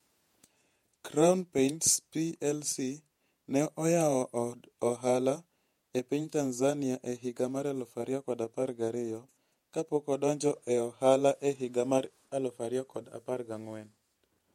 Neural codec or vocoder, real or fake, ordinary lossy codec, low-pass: vocoder, 48 kHz, 128 mel bands, Vocos; fake; MP3, 64 kbps; 19.8 kHz